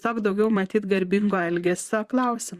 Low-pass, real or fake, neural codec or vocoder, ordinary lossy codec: 14.4 kHz; fake; vocoder, 44.1 kHz, 128 mel bands, Pupu-Vocoder; Opus, 64 kbps